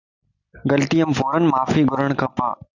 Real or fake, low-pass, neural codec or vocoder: real; 7.2 kHz; none